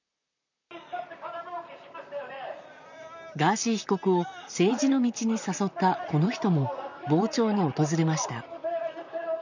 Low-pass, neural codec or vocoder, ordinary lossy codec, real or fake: 7.2 kHz; vocoder, 44.1 kHz, 128 mel bands, Pupu-Vocoder; none; fake